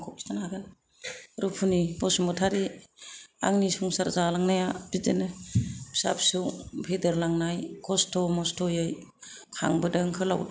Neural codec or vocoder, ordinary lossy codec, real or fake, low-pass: none; none; real; none